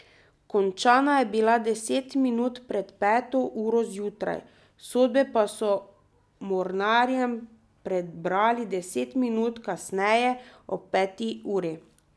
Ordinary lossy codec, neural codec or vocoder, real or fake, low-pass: none; none; real; none